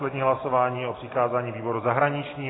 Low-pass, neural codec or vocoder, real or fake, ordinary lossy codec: 7.2 kHz; none; real; AAC, 16 kbps